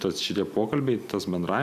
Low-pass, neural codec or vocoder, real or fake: 14.4 kHz; none; real